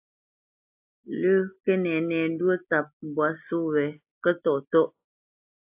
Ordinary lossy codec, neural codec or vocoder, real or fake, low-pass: AAC, 32 kbps; none; real; 3.6 kHz